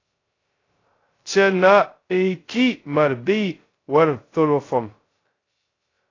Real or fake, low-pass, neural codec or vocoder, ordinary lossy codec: fake; 7.2 kHz; codec, 16 kHz, 0.2 kbps, FocalCodec; AAC, 32 kbps